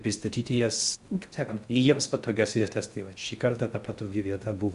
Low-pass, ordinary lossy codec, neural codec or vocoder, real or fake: 10.8 kHz; Opus, 64 kbps; codec, 16 kHz in and 24 kHz out, 0.6 kbps, FocalCodec, streaming, 4096 codes; fake